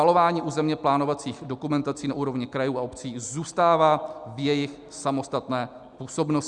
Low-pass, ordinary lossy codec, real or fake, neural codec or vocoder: 10.8 kHz; Opus, 64 kbps; real; none